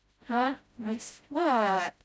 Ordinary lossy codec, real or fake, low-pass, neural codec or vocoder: none; fake; none; codec, 16 kHz, 0.5 kbps, FreqCodec, smaller model